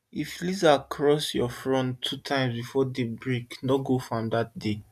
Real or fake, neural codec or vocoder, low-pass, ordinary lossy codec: real; none; 14.4 kHz; none